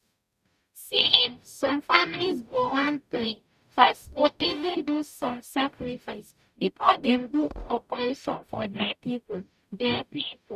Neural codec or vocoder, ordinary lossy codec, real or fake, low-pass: codec, 44.1 kHz, 0.9 kbps, DAC; none; fake; 14.4 kHz